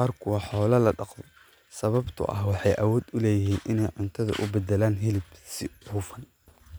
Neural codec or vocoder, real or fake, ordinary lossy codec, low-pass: none; real; none; none